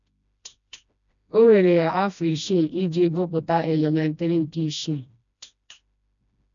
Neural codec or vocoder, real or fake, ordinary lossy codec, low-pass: codec, 16 kHz, 1 kbps, FreqCodec, smaller model; fake; none; 7.2 kHz